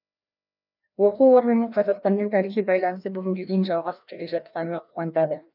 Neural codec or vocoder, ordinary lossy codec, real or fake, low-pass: codec, 16 kHz, 1 kbps, FreqCodec, larger model; none; fake; 5.4 kHz